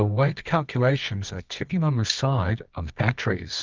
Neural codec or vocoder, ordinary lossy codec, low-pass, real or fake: codec, 24 kHz, 0.9 kbps, WavTokenizer, medium music audio release; Opus, 24 kbps; 7.2 kHz; fake